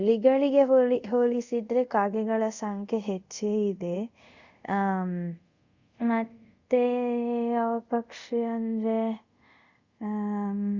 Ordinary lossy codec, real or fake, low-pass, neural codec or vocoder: Opus, 64 kbps; fake; 7.2 kHz; codec, 24 kHz, 0.5 kbps, DualCodec